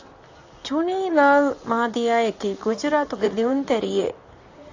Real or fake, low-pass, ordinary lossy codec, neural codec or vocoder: fake; 7.2 kHz; AAC, 48 kbps; vocoder, 44.1 kHz, 128 mel bands, Pupu-Vocoder